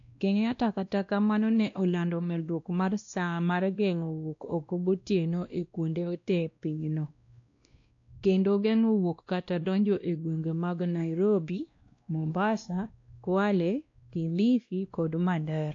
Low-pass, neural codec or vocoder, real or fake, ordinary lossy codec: 7.2 kHz; codec, 16 kHz, 1 kbps, X-Codec, WavLM features, trained on Multilingual LibriSpeech; fake; AAC, 48 kbps